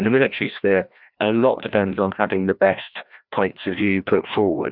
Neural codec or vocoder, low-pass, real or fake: codec, 16 kHz, 1 kbps, FreqCodec, larger model; 5.4 kHz; fake